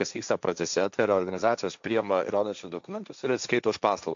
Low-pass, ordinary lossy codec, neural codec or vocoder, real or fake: 7.2 kHz; MP3, 64 kbps; codec, 16 kHz, 1.1 kbps, Voila-Tokenizer; fake